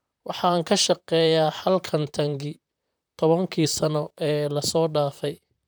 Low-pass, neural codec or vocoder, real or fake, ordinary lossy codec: none; vocoder, 44.1 kHz, 128 mel bands, Pupu-Vocoder; fake; none